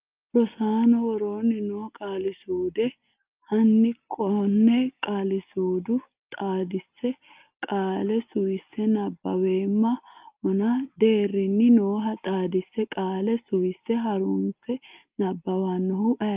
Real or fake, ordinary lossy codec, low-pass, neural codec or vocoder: real; Opus, 24 kbps; 3.6 kHz; none